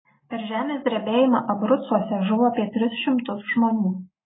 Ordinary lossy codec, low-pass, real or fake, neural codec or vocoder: AAC, 16 kbps; 7.2 kHz; real; none